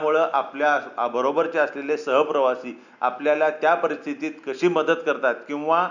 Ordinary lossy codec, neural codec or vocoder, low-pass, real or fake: none; none; 7.2 kHz; real